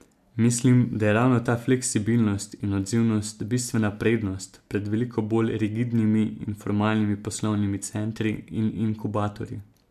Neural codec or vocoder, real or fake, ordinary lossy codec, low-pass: none; real; MP3, 96 kbps; 14.4 kHz